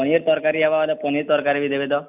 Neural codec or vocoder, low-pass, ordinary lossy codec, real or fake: none; 3.6 kHz; none; real